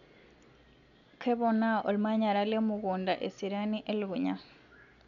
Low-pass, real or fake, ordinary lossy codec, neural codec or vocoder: 7.2 kHz; real; none; none